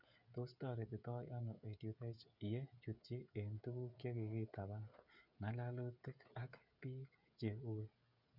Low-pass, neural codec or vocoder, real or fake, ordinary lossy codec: 5.4 kHz; codec, 16 kHz, 16 kbps, FreqCodec, smaller model; fake; none